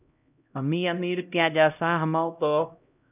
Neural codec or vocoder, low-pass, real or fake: codec, 16 kHz, 0.5 kbps, X-Codec, HuBERT features, trained on LibriSpeech; 3.6 kHz; fake